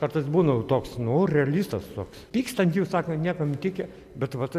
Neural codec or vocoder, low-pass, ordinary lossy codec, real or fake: none; 14.4 kHz; MP3, 96 kbps; real